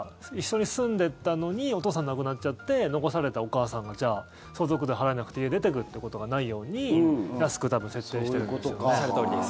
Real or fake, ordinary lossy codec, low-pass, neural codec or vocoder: real; none; none; none